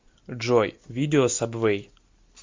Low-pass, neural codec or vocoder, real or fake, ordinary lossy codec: 7.2 kHz; none; real; MP3, 64 kbps